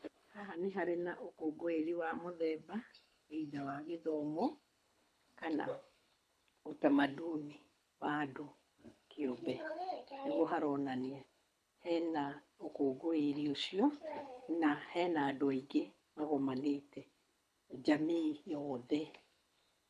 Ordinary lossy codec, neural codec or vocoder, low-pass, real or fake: none; codec, 24 kHz, 6 kbps, HILCodec; none; fake